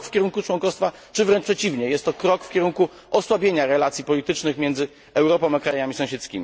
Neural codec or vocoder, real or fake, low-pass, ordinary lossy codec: none; real; none; none